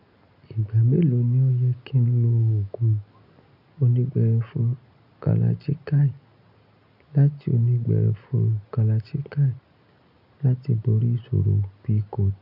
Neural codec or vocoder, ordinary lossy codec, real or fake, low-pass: none; none; real; 5.4 kHz